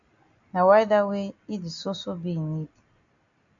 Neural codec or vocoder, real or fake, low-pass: none; real; 7.2 kHz